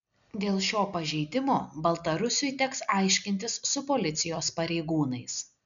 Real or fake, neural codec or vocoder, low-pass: real; none; 7.2 kHz